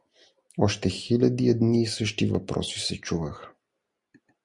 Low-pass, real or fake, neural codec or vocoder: 10.8 kHz; real; none